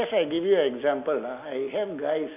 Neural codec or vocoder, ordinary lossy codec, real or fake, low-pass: none; none; real; 3.6 kHz